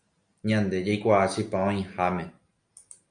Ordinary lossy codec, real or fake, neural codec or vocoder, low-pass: MP3, 96 kbps; real; none; 9.9 kHz